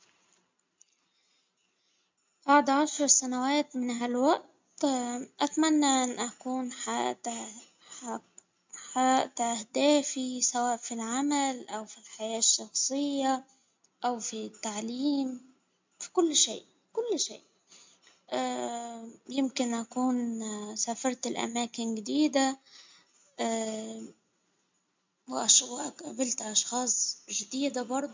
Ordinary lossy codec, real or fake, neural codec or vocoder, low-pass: MP3, 64 kbps; real; none; 7.2 kHz